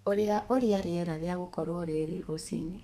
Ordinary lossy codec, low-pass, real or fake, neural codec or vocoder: none; 14.4 kHz; fake; codec, 32 kHz, 1.9 kbps, SNAC